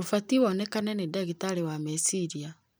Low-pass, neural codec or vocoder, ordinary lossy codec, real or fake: none; vocoder, 44.1 kHz, 128 mel bands every 256 samples, BigVGAN v2; none; fake